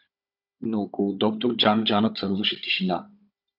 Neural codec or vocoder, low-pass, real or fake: codec, 16 kHz, 16 kbps, FunCodec, trained on Chinese and English, 50 frames a second; 5.4 kHz; fake